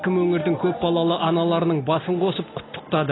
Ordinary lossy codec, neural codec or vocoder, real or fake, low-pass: AAC, 16 kbps; none; real; 7.2 kHz